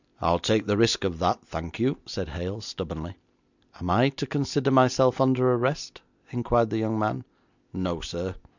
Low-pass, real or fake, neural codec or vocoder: 7.2 kHz; real; none